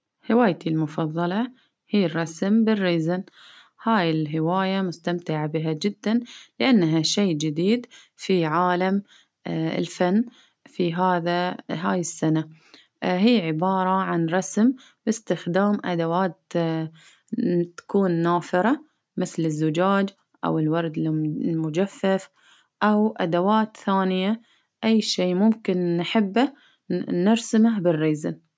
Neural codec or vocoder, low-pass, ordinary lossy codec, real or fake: none; none; none; real